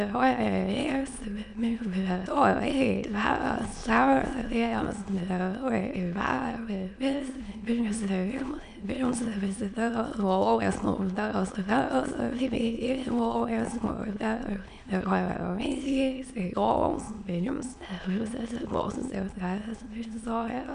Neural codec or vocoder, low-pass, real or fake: autoencoder, 22.05 kHz, a latent of 192 numbers a frame, VITS, trained on many speakers; 9.9 kHz; fake